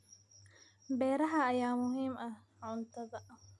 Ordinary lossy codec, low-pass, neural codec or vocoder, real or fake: none; none; none; real